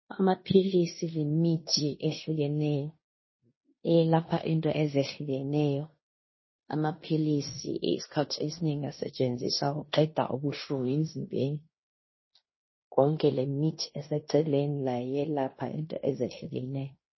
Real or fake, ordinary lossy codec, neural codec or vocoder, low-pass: fake; MP3, 24 kbps; codec, 16 kHz in and 24 kHz out, 0.9 kbps, LongCat-Audio-Codec, fine tuned four codebook decoder; 7.2 kHz